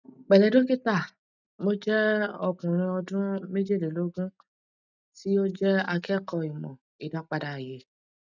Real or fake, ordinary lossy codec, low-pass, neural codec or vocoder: real; none; 7.2 kHz; none